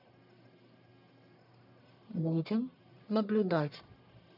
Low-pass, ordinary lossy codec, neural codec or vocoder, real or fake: 5.4 kHz; none; codec, 44.1 kHz, 1.7 kbps, Pupu-Codec; fake